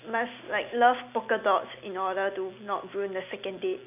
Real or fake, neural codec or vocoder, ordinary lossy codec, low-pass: real; none; none; 3.6 kHz